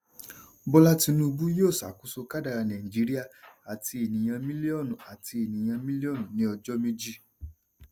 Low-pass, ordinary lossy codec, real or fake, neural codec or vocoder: none; none; real; none